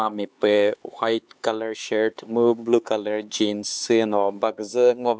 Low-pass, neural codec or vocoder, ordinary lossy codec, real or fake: none; codec, 16 kHz, 4 kbps, X-Codec, HuBERT features, trained on LibriSpeech; none; fake